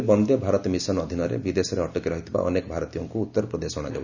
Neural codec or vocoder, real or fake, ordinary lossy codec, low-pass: none; real; none; 7.2 kHz